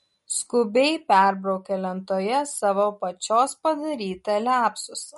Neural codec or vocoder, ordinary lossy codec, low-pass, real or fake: none; MP3, 48 kbps; 14.4 kHz; real